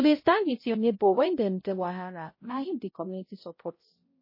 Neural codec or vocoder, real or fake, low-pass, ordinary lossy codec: codec, 16 kHz, 0.5 kbps, X-Codec, HuBERT features, trained on balanced general audio; fake; 5.4 kHz; MP3, 24 kbps